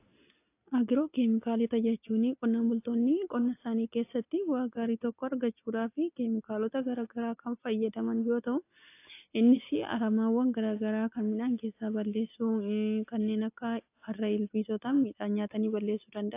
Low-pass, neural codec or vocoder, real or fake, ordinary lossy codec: 3.6 kHz; none; real; AAC, 24 kbps